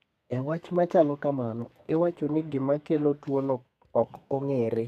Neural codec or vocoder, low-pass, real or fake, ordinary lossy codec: codec, 32 kHz, 1.9 kbps, SNAC; 14.4 kHz; fake; none